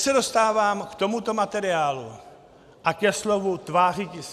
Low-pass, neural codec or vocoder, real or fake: 14.4 kHz; none; real